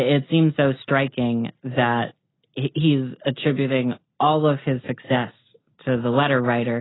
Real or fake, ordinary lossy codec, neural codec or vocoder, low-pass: real; AAC, 16 kbps; none; 7.2 kHz